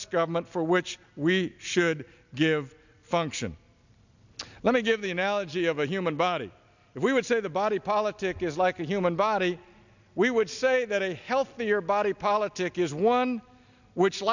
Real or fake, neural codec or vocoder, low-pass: real; none; 7.2 kHz